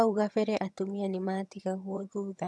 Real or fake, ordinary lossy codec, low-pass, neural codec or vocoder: fake; none; none; vocoder, 22.05 kHz, 80 mel bands, HiFi-GAN